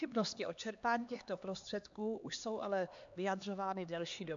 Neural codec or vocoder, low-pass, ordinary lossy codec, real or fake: codec, 16 kHz, 2 kbps, X-Codec, HuBERT features, trained on LibriSpeech; 7.2 kHz; MP3, 64 kbps; fake